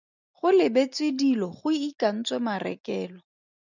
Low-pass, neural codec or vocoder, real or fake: 7.2 kHz; none; real